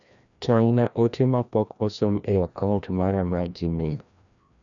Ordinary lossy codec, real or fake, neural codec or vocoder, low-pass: none; fake; codec, 16 kHz, 1 kbps, FreqCodec, larger model; 7.2 kHz